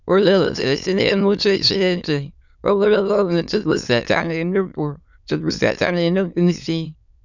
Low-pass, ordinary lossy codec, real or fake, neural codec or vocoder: 7.2 kHz; none; fake; autoencoder, 22.05 kHz, a latent of 192 numbers a frame, VITS, trained on many speakers